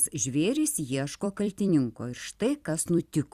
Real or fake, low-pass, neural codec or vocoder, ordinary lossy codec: fake; 14.4 kHz; vocoder, 44.1 kHz, 128 mel bands every 256 samples, BigVGAN v2; Opus, 64 kbps